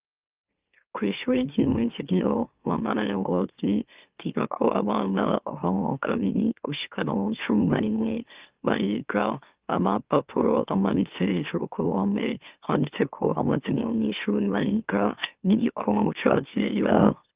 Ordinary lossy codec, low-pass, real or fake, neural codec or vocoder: Opus, 32 kbps; 3.6 kHz; fake; autoencoder, 44.1 kHz, a latent of 192 numbers a frame, MeloTTS